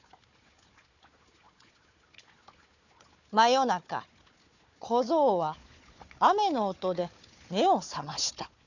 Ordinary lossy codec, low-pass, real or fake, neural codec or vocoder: none; 7.2 kHz; fake; codec, 16 kHz, 4 kbps, FunCodec, trained on Chinese and English, 50 frames a second